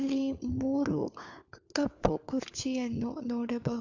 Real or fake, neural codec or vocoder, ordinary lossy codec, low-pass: fake; codec, 16 kHz, 4 kbps, FunCodec, trained on LibriTTS, 50 frames a second; AAC, 48 kbps; 7.2 kHz